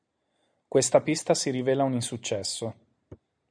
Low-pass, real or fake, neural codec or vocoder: 9.9 kHz; real; none